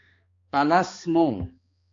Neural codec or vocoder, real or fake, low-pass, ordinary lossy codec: codec, 16 kHz, 2 kbps, X-Codec, HuBERT features, trained on balanced general audio; fake; 7.2 kHz; AAC, 48 kbps